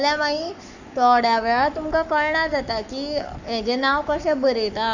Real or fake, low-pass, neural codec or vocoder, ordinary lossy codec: fake; 7.2 kHz; codec, 44.1 kHz, 7.8 kbps, Pupu-Codec; none